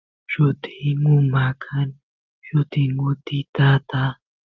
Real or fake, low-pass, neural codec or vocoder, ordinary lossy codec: real; 7.2 kHz; none; Opus, 32 kbps